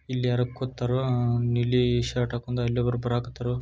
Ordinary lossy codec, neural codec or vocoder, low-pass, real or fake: none; none; none; real